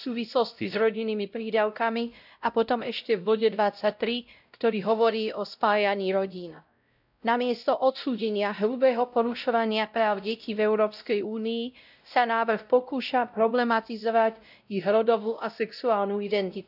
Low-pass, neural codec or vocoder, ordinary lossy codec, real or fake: 5.4 kHz; codec, 16 kHz, 0.5 kbps, X-Codec, WavLM features, trained on Multilingual LibriSpeech; none; fake